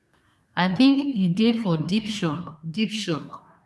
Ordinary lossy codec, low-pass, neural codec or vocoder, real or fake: none; none; codec, 24 kHz, 1 kbps, SNAC; fake